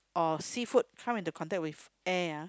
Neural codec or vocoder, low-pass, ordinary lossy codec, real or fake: none; none; none; real